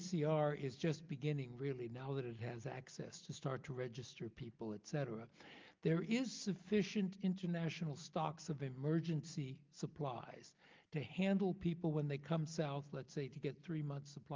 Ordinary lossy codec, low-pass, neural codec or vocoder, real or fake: Opus, 24 kbps; 7.2 kHz; none; real